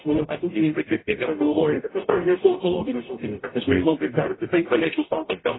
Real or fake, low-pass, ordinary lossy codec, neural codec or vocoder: fake; 7.2 kHz; AAC, 16 kbps; codec, 44.1 kHz, 0.9 kbps, DAC